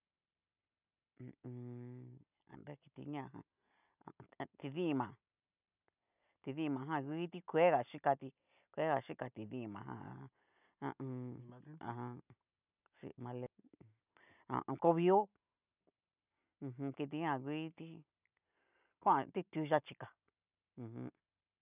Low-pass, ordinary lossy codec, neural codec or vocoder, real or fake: 3.6 kHz; none; none; real